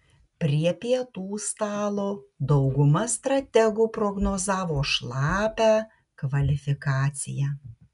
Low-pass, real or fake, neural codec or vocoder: 10.8 kHz; real; none